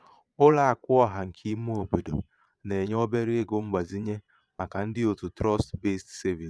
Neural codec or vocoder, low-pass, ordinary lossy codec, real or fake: vocoder, 22.05 kHz, 80 mel bands, Vocos; none; none; fake